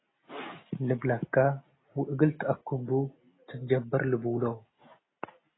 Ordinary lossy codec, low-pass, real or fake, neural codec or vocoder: AAC, 16 kbps; 7.2 kHz; real; none